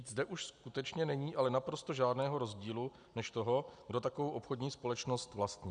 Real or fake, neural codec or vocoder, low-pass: real; none; 9.9 kHz